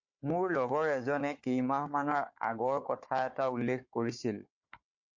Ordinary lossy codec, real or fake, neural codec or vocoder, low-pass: MP3, 48 kbps; fake; codec, 16 kHz, 4 kbps, FunCodec, trained on Chinese and English, 50 frames a second; 7.2 kHz